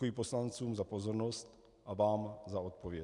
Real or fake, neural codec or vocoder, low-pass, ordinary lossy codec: real; none; 10.8 kHz; MP3, 96 kbps